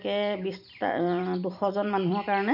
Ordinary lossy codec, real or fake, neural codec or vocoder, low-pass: none; fake; vocoder, 44.1 kHz, 128 mel bands every 256 samples, BigVGAN v2; 5.4 kHz